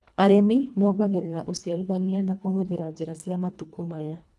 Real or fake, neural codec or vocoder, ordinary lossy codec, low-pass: fake; codec, 24 kHz, 1.5 kbps, HILCodec; none; none